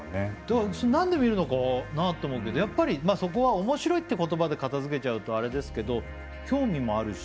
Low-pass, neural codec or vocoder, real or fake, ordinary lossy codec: none; none; real; none